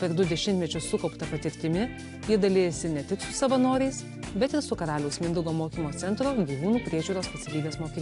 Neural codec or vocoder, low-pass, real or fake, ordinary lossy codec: none; 10.8 kHz; real; AAC, 64 kbps